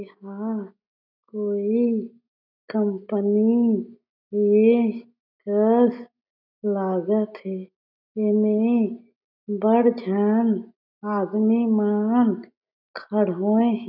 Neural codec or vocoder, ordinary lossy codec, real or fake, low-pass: none; none; real; 5.4 kHz